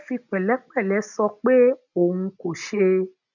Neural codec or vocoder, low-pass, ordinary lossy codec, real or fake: none; 7.2 kHz; none; real